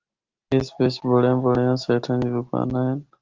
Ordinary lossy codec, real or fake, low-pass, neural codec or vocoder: Opus, 16 kbps; real; 7.2 kHz; none